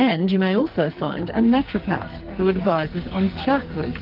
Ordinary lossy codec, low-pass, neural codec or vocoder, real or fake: Opus, 32 kbps; 5.4 kHz; codec, 44.1 kHz, 2.6 kbps, SNAC; fake